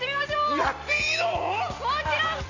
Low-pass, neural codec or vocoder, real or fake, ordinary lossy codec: 7.2 kHz; none; real; none